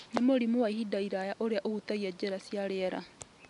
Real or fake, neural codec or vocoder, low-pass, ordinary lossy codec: real; none; 10.8 kHz; none